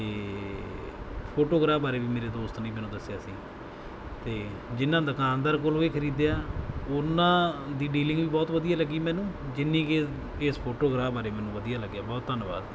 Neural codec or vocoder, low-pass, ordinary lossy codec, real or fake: none; none; none; real